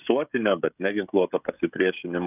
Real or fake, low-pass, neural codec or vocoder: fake; 3.6 kHz; codec, 16 kHz, 16 kbps, FreqCodec, smaller model